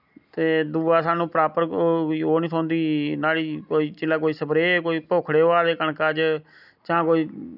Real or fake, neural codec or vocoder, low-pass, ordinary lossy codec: real; none; 5.4 kHz; none